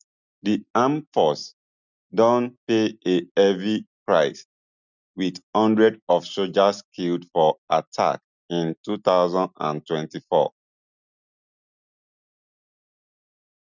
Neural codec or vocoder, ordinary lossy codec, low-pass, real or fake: none; none; 7.2 kHz; real